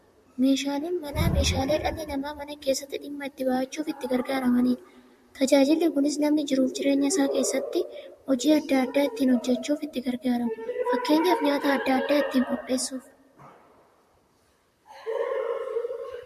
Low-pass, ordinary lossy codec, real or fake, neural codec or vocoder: 14.4 kHz; MP3, 64 kbps; fake; vocoder, 44.1 kHz, 128 mel bands, Pupu-Vocoder